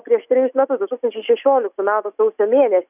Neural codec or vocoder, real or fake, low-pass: none; real; 3.6 kHz